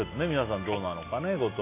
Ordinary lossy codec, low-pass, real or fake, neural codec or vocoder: MP3, 24 kbps; 3.6 kHz; real; none